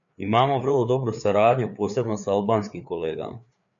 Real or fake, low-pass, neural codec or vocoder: fake; 7.2 kHz; codec, 16 kHz, 4 kbps, FreqCodec, larger model